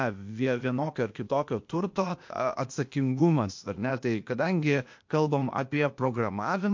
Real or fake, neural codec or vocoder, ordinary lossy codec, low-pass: fake; codec, 16 kHz, 0.8 kbps, ZipCodec; MP3, 48 kbps; 7.2 kHz